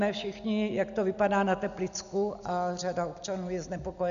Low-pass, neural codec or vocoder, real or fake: 7.2 kHz; none; real